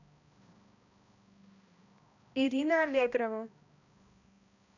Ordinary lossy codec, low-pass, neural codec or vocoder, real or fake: none; 7.2 kHz; codec, 16 kHz, 1 kbps, X-Codec, HuBERT features, trained on balanced general audio; fake